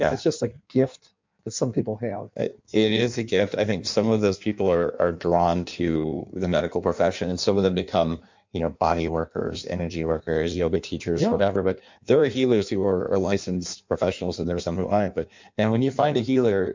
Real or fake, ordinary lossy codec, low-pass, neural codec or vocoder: fake; MP3, 64 kbps; 7.2 kHz; codec, 16 kHz in and 24 kHz out, 1.1 kbps, FireRedTTS-2 codec